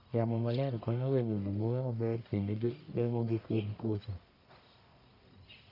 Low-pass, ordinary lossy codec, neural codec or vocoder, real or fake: 5.4 kHz; none; codec, 44.1 kHz, 1.7 kbps, Pupu-Codec; fake